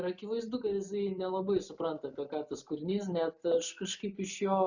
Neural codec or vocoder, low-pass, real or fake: none; 7.2 kHz; real